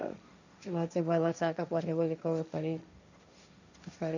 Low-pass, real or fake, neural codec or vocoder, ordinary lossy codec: 7.2 kHz; fake; codec, 16 kHz, 1.1 kbps, Voila-Tokenizer; none